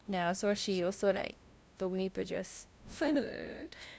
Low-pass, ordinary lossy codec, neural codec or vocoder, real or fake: none; none; codec, 16 kHz, 0.5 kbps, FunCodec, trained on LibriTTS, 25 frames a second; fake